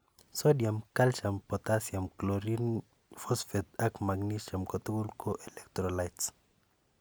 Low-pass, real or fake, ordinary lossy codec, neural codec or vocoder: none; real; none; none